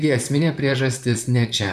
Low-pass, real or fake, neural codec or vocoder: 14.4 kHz; fake; vocoder, 44.1 kHz, 128 mel bands, Pupu-Vocoder